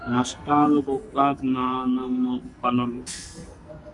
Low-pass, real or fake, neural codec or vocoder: 10.8 kHz; fake; codec, 44.1 kHz, 2.6 kbps, SNAC